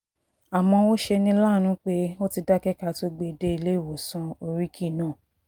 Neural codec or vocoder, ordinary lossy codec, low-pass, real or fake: none; Opus, 32 kbps; 19.8 kHz; real